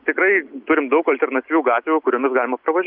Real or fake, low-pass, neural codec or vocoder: real; 5.4 kHz; none